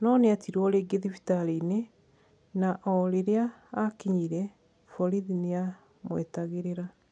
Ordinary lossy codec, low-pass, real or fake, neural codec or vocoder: none; 9.9 kHz; real; none